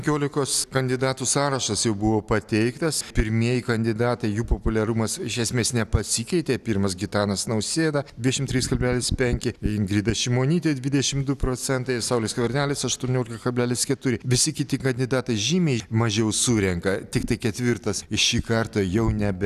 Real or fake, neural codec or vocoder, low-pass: real; none; 14.4 kHz